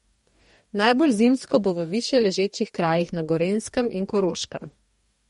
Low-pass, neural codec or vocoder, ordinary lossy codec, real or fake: 19.8 kHz; codec, 44.1 kHz, 2.6 kbps, DAC; MP3, 48 kbps; fake